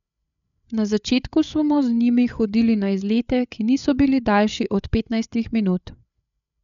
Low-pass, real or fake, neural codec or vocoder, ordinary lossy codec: 7.2 kHz; fake; codec, 16 kHz, 8 kbps, FreqCodec, larger model; none